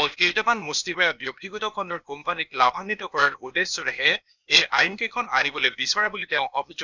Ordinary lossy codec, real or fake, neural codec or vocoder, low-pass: none; fake; codec, 16 kHz, 0.8 kbps, ZipCodec; 7.2 kHz